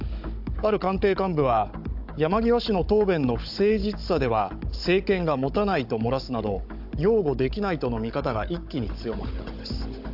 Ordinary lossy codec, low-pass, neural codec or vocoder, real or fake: AAC, 48 kbps; 5.4 kHz; codec, 16 kHz, 16 kbps, FunCodec, trained on Chinese and English, 50 frames a second; fake